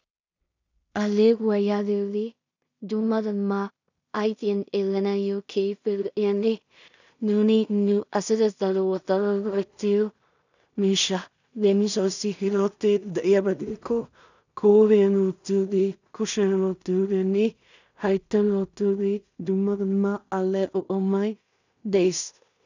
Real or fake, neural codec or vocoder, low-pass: fake; codec, 16 kHz in and 24 kHz out, 0.4 kbps, LongCat-Audio-Codec, two codebook decoder; 7.2 kHz